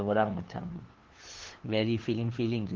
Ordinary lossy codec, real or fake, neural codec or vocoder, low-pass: Opus, 16 kbps; fake; codec, 16 kHz, 2 kbps, FunCodec, trained on LibriTTS, 25 frames a second; 7.2 kHz